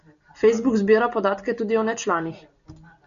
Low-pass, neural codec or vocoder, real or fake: 7.2 kHz; none; real